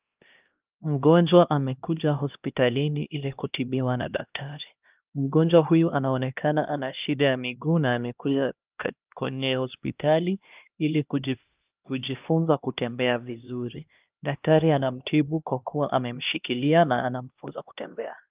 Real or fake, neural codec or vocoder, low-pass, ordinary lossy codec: fake; codec, 16 kHz, 1 kbps, X-Codec, HuBERT features, trained on LibriSpeech; 3.6 kHz; Opus, 32 kbps